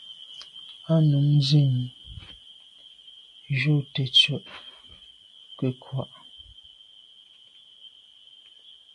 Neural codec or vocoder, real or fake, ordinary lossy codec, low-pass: none; real; AAC, 64 kbps; 10.8 kHz